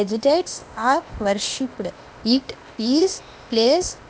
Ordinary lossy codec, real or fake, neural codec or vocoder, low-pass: none; fake; codec, 16 kHz, 0.8 kbps, ZipCodec; none